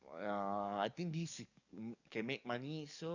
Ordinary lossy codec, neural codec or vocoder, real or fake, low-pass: none; codec, 44.1 kHz, 7.8 kbps, DAC; fake; 7.2 kHz